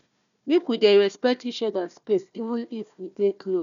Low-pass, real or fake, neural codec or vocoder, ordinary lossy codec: 7.2 kHz; fake; codec, 16 kHz, 1 kbps, FunCodec, trained on Chinese and English, 50 frames a second; none